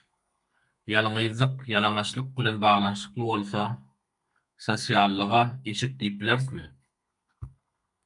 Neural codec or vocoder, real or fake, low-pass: codec, 32 kHz, 1.9 kbps, SNAC; fake; 10.8 kHz